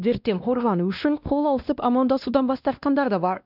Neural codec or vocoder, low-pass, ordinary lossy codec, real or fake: codec, 16 kHz, 1 kbps, X-Codec, WavLM features, trained on Multilingual LibriSpeech; 5.4 kHz; none; fake